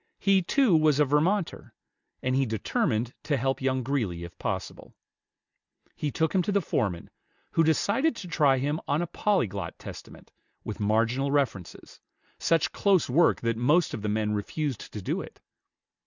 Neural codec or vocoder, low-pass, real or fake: none; 7.2 kHz; real